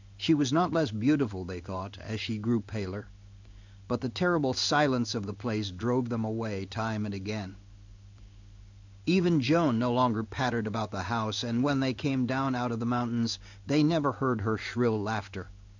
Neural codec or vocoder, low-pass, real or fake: codec, 16 kHz in and 24 kHz out, 1 kbps, XY-Tokenizer; 7.2 kHz; fake